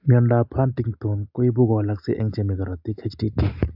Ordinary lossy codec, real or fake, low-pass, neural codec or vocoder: AAC, 48 kbps; real; 5.4 kHz; none